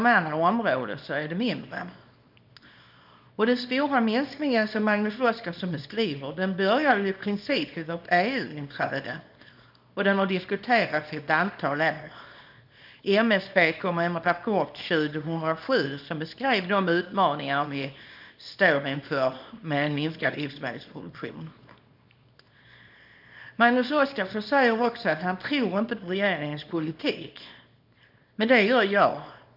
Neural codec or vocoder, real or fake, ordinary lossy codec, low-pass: codec, 24 kHz, 0.9 kbps, WavTokenizer, small release; fake; none; 5.4 kHz